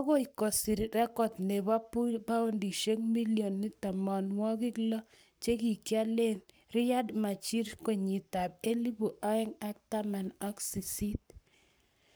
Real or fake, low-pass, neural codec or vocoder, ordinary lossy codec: fake; none; codec, 44.1 kHz, 7.8 kbps, Pupu-Codec; none